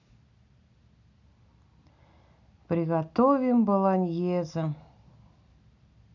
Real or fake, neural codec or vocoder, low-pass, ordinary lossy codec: real; none; 7.2 kHz; none